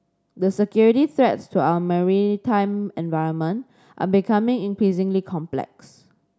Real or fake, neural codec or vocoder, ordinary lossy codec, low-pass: real; none; none; none